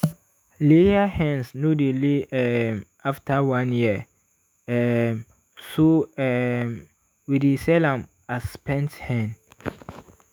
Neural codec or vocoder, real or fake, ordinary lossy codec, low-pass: autoencoder, 48 kHz, 128 numbers a frame, DAC-VAE, trained on Japanese speech; fake; none; none